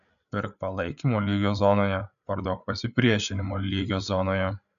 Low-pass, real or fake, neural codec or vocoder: 7.2 kHz; fake; codec, 16 kHz, 8 kbps, FreqCodec, larger model